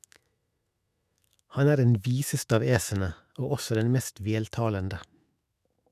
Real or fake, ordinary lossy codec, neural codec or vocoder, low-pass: fake; none; autoencoder, 48 kHz, 128 numbers a frame, DAC-VAE, trained on Japanese speech; 14.4 kHz